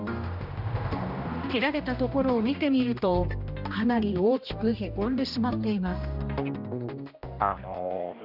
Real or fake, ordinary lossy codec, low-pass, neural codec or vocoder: fake; none; 5.4 kHz; codec, 16 kHz, 1 kbps, X-Codec, HuBERT features, trained on general audio